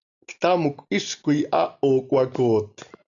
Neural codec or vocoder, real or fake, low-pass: none; real; 7.2 kHz